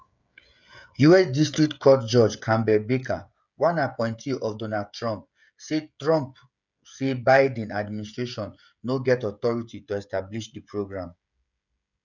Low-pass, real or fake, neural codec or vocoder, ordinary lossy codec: 7.2 kHz; fake; codec, 16 kHz, 16 kbps, FreqCodec, smaller model; none